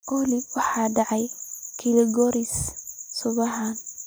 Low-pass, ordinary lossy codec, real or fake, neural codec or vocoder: none; none; real; none